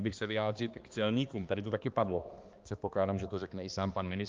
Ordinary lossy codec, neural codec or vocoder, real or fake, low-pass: Opus, 32 kbps; codec, 16 kHz, 2 kbps, X-Codec, HuBERT features, trained on balanced general audio; fake; 7.2 kHz